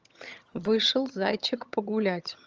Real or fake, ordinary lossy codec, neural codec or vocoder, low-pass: fake; Opus, 24 kbps; vocoder, 22.05 kHz, 80 mel bands, HiFi-GAN; 7.2 kHz